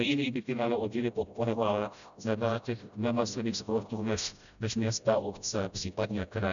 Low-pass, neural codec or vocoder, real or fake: 7.2 kHz; codec, 16 kHz, 0.5 kbps, FreqCodec, smaller model; fake